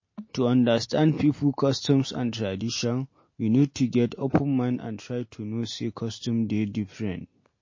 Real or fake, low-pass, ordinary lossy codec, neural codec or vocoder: real; 7.2 kHz; MP3, 32 kbps; none